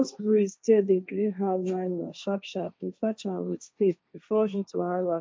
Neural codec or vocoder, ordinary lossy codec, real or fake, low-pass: codec, 16 kHz, 1.1 kbps, Voila-Tokenizer; none; fake; none